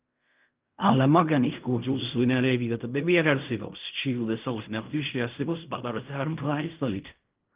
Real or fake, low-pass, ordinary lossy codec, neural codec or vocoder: fake; 3.6 kHz; Opus, 24 kbps; codec, 16 kHz in and 24 kHz out, 0.4 kbps, LongCat-Audio-Codec, fine tuned four codebook decoder